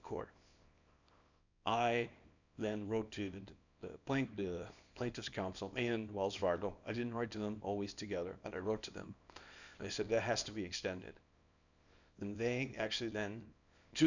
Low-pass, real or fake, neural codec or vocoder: 7.2 kHz; fake; codec, 24 kHz, 0.9 kbps, WavTokenizer, small release